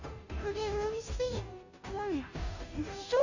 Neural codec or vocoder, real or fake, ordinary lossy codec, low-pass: codec, 16 kHz, 0.5 kbps, FunCodec, trained on Chinese and English, 25 frames a second; fake; none; 7.2 kHz